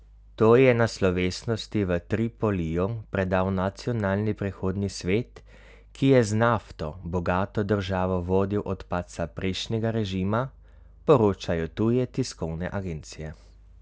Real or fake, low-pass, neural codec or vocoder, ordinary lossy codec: real; none; none; none